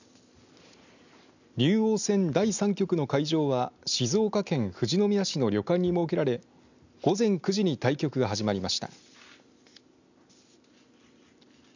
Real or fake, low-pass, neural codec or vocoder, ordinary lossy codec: real; 7.2 kHz; none; none